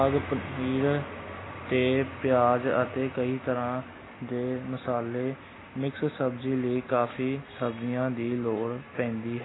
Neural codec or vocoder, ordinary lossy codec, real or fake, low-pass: none; AAC, 16 kbps; real; 7.2 kHz